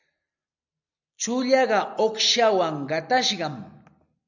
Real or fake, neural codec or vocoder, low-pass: real; none; 7.2 kHz